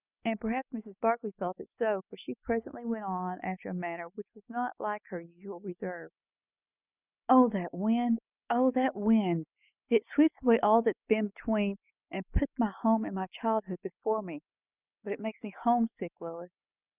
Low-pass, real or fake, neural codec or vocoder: 3.6 kHz; real; none